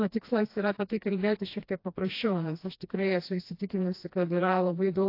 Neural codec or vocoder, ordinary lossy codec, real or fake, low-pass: codec, 16 kHz, 1 kbps, FreqCodec, smaller model; AAC, 32 kbps; fake; 5.4 kHz